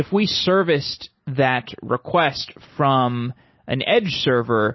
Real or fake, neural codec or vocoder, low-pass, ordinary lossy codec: real; none; 7.2 kHz; MP3, 24 kbps